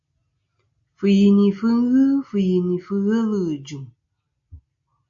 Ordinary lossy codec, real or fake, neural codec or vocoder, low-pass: AAC, 64 kbps; real; none; 7.2 kHz